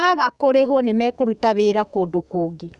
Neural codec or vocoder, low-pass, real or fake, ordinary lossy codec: codec, 32 kHz, 1.9 kbps, SNAC; 10.8 kHz; fake; none